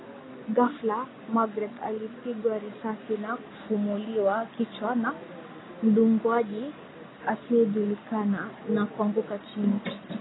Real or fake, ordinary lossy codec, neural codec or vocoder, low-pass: real; AAC, 16 kbps; none; 7.2 kHz